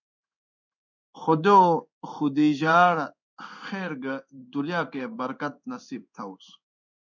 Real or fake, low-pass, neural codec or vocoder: fake; 7.2 kHz; codec, 16 kHz in and 24 kHz out, 1 kbps, XY-Tokenizer